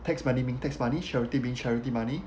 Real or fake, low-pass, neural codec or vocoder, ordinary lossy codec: real; none; none; none